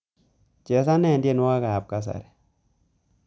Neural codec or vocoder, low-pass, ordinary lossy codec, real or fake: none; none; none; real